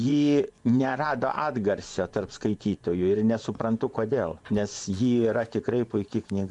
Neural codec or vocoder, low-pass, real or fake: vocoder, 24 kHz, 100 mel bands, Vocos; 10.8 kHz; fake